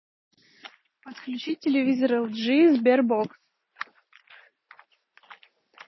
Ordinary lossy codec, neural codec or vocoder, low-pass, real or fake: MP3, 24 kbps; none; 7.2 kHz; real